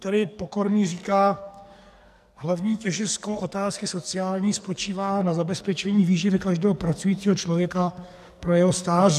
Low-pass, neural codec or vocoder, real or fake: 14.4 kHz; codec, 44.1 kHz, 2.6 kbps, SNAC; fake